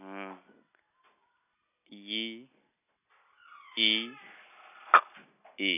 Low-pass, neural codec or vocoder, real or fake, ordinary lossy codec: 3.6 kHz; none; real; none